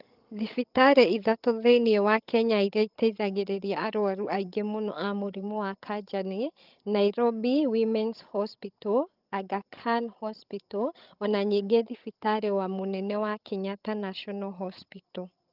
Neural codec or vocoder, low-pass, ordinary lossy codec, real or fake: codec, 16 kHz, 16 kbps, FreqCodec, larger model; 5.4 kHz; Opus, 32 kbps; fake